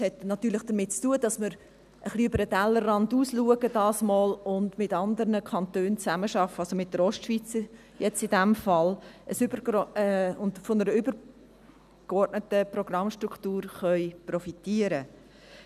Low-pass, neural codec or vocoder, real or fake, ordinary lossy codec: 14.4 kHz; none; real; none